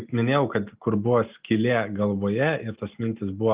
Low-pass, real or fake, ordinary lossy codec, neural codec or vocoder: 3.6 kHz; real; Opus, 32 kbps; none